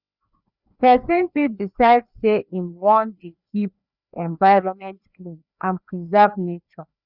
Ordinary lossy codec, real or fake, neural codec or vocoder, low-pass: none; fake; codec, 16 kHz, 2 kbps, FreqCodec, larger model; 5.4 kHz